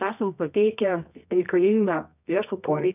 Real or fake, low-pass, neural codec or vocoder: fake; 3.6 kHz; codec, 24 kHz, 0.9 kbps, WavTokenizer, medium music audio release